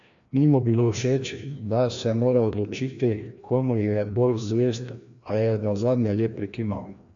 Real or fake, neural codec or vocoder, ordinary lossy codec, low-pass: fake; codec, 16 kHz, 1 kbps, FreqCodec, larger model; AAC, 64 kbps; 7.2 kHz